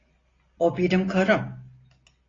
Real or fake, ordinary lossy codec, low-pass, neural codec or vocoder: real; AAC, 32 kbps; 7.2 kHz; none